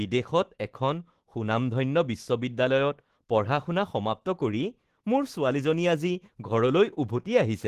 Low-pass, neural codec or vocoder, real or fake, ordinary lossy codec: 14.4 kHz; autoencoder, 48 kHz, 128 numbers a frame, DAC-VAE, trained on Japanese speech; fake; Opus, 16 kbps